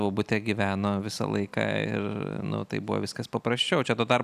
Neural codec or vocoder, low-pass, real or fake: none; 14.4 kHz; real